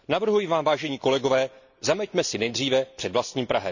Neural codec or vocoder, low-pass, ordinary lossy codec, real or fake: none; 7.2 kHz; none; real